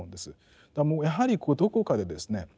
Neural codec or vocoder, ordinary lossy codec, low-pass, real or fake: none; none; none; real